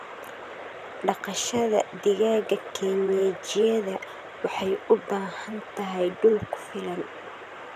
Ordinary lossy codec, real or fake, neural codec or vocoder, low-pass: none; fake; vocoder, 44.1 kHz, 128 mel bands, Pupu-Vocoder; 14.4 kHz